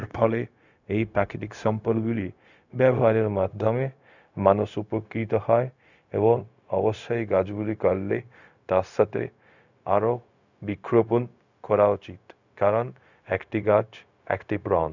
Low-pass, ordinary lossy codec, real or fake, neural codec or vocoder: 7.2 kHz; none; fake; codec, 16 kHz, 0.4 kbps, LongCat-Audio-Codec